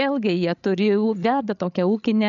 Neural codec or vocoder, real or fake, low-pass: codec, 16 kHz, 16 kbps, FreqCodec, larger model; fake; 7.2 kHz